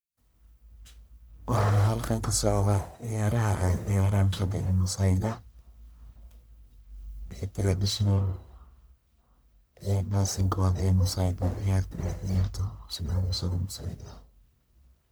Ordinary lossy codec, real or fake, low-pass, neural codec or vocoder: none; fake; none; codec, 44.1 kHz, 1.7 kbps, Pupu-Codec